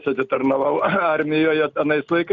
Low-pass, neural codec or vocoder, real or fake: 7.2 kHz; none; real